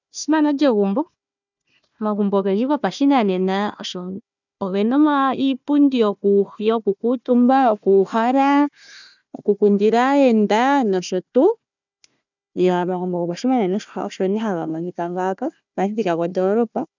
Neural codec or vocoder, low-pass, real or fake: codec, 16 kHz, 1 kbps, FunCodec, trained on Chinese and English, 50 frames a second; 7.2 kHz; fake